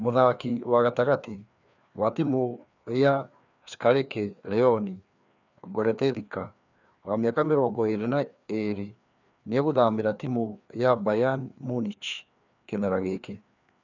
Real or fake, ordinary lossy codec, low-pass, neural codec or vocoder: fake; none; 7.2 kHz; codec, 16 kHz, 2 kbps, FreqCodec, larger model